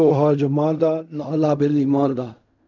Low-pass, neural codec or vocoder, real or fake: 7.2 kHz; codec, 16 kHz in and 24 kHz out, 0.4 kbps, LongCat-Audio-Codec, fine tuned four codebook decoder; fake